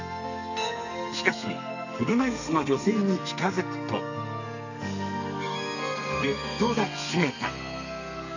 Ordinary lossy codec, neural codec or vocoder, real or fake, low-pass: none; codec, 32 kHz, 1.9 kbps, SNAC; fake; 7.2 kHz